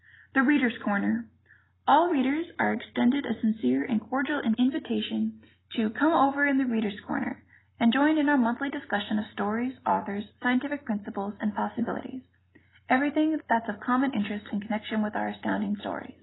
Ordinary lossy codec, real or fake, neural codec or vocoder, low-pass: AAC, 16 kbps; real; none; 7.2 kHz